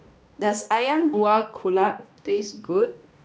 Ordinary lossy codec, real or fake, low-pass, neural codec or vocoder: none; fake; none; codec, 16 kHz, 1 kbps, X-Codec, HuBERT features, trained on balanced general audio